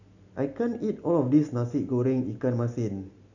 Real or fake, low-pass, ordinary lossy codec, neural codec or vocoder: real; 7.2 kHz; none; none